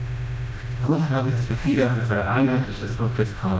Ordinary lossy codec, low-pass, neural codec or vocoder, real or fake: none; none; codec, 16 kHz, 0.5 kbps, FreqCodec, smaller model; fake